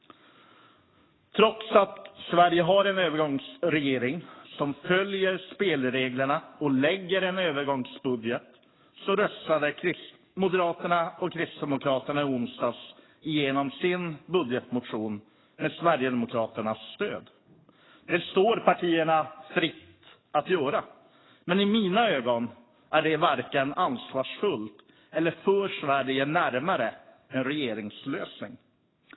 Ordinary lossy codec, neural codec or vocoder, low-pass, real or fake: AAC, 16 kbps; codec, 44.1 kHz, 7.8 kbps, DAC; 7.2 kHz; fake